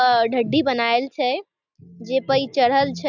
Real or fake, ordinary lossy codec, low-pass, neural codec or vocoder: real; none; 7.2 kHz; none